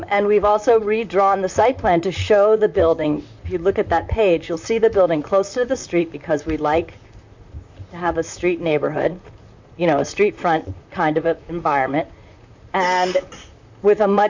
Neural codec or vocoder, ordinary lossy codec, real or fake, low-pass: vocoder, 44.1 kHz, 128 mel bands, Pupu-Vocoder; MP3, 64 kbps; fake; 7.2 kHz